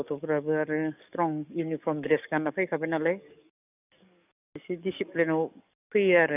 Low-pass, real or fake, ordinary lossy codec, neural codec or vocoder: 3.6 kHz; fake; none; codec, 44.1 kHz, 7.8 kbps, DAC